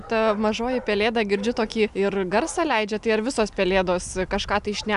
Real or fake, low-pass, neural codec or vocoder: real; 10.8 kHz; none